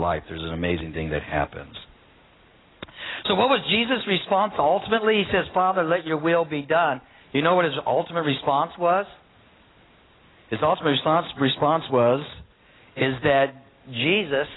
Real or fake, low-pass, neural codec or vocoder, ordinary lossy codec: real; 7.2 kHz; none; AAC, 16 kbps